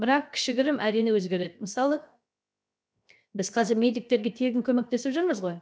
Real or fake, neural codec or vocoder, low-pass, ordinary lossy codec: fake; codec, 16 kHz, 0.7 kbps, FocalCodec; none; none